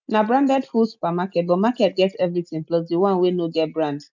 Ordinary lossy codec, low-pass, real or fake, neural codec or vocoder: none; 7.2 kHz; real; none